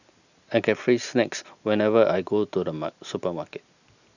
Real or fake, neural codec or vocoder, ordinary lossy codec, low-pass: real; none; none; 7.2 kHz